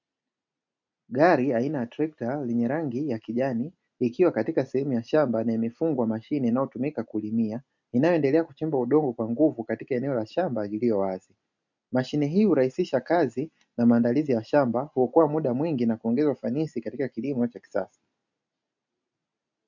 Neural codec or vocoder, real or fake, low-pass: none; real; 7.2 kHz